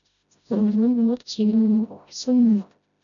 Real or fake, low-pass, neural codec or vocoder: fake; 7.2 kHz; codec, 16 kHz, 0.5 kbps, FreqCodec, smaller model